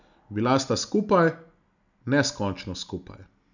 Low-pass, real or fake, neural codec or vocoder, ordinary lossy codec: 7.2 kHz; real; none; none